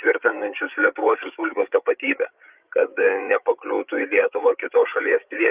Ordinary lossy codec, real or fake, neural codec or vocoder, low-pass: Opus, 32 kbps; fake; codec, 16 kHz, 8 kbps, FreqCodec, larger model; 3.6 kHz